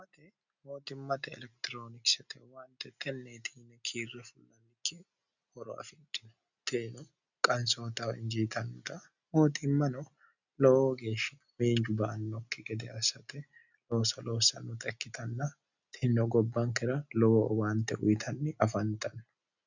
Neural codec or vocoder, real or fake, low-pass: none; real; 7.2 kHz